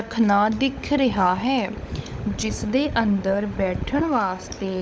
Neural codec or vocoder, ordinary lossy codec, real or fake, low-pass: codec, 16 kHz, 16 kbps, FunCodec, trained on LibriTTS, 50 frames a second; none; fake; none